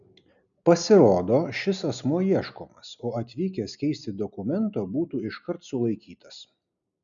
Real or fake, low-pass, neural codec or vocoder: real; 7.2 kHz; none